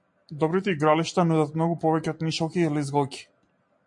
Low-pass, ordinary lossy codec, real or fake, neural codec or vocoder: 10.8 kHz; MP3, 48 kbps; real; none